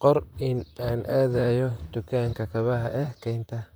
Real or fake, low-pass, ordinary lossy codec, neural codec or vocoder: fake; none; none; vocoder, 44.1 kHz, 128 mel bands, Pupu-Vocoder